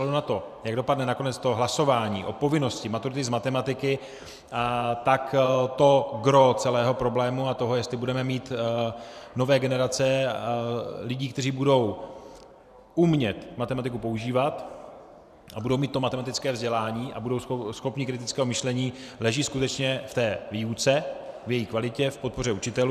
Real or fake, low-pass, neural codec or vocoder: fake; 14.4 kHz; vocoder, 44.1 kHz, 128 mel bands every 512 samples, BigVGAN v2